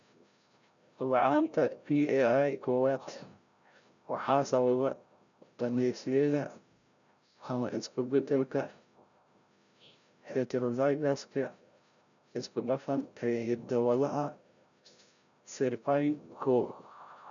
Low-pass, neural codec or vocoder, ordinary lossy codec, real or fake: 7.2 kHz; codec, 16 kHz, 0.5 kbps, FreqCodec, larger model; none; fake